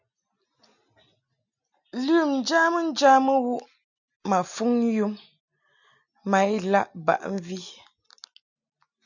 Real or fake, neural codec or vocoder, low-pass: real; none; 7.2 kHz